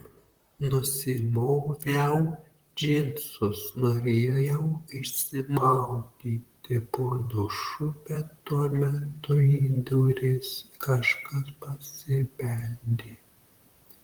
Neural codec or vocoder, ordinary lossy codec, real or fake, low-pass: vocoder, 44.1 kHz, 128 mel bands, Pupu-Vocoder; Opus, 24 kbps; fake; 19.8 kHz